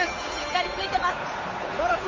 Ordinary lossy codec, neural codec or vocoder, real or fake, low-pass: MP3, 32 kbps; codec, 16 kHz, 8 kbps, FunCodec, trained on Chinese and English, 25 frames a second; fake; 7.2 kHz